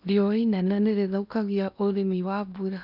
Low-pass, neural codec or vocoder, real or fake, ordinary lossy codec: 5.4 kHz; codec, 16 kHz in and 24 kHz out, 0.8 kbps, FocalCodec, streaming, 65536 codes; fake; none